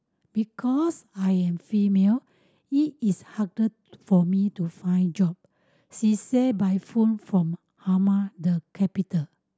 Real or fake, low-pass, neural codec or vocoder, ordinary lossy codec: real; none; none; none